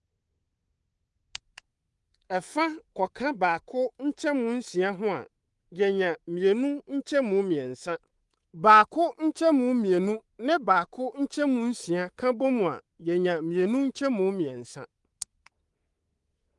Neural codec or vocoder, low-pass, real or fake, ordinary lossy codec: codec, 24 kHz, 3.1 kbps, DualCodec; 10.8 kHz; fake; Opus, 24 kbps